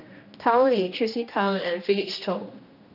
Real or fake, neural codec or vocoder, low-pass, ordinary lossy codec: fake; codec, 16 kHz, 1 kbps, X-Codec, HuBERT features, trained on general audio; 5.4 kHz; none